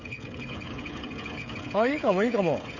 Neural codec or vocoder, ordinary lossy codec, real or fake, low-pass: codec, 16 kHz, 16 kbps, FreqCodec, smaller model; none; fake; 7.2 kHz